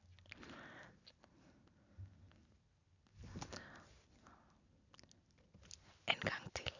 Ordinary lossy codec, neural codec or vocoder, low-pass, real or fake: none; none; 7.2 kHz; real